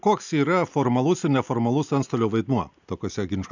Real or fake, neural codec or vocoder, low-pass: real; none; 7.2 kHz